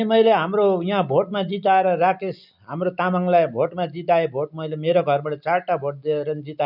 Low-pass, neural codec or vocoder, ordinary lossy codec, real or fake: 5.4 kHz; none; none; real